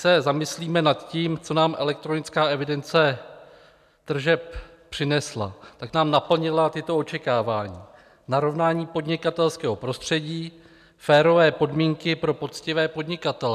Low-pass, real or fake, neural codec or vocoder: 14.4 kHz; real; none